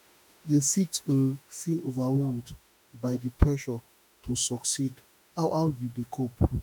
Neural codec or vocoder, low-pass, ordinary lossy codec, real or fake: autoencoder, 48 kHz, 32 numbers a frame, DAC-VAE, trained on Japanese speech; none; none; fake